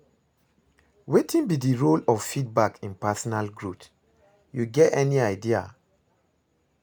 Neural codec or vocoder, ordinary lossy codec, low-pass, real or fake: none; none; none; real